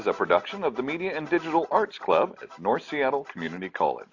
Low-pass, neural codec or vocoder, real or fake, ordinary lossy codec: 7.2 kHz; none; real; AAC, 48 kbps